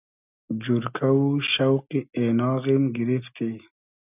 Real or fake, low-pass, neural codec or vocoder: real; 3.6 kHz; none